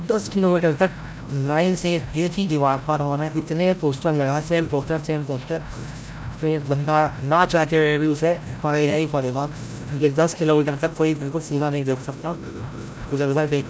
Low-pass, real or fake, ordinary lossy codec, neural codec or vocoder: none; fake; none; codec, 16 kHz, 0.5 kbps, FreqCodec, larger model